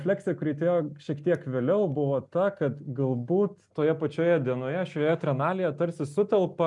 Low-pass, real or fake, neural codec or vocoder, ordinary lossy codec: 10.8 kHz; real; none; MP3, 96 kbps